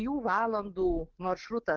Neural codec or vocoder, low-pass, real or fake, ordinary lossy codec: codec, 44.1 kHz, 7.8 kbps, DAC; 7.2 kHz; fake; Opus, 16 kbps